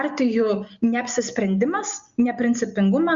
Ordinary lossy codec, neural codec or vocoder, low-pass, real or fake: Opus, 64 kbps; none; 7.2 kHz; real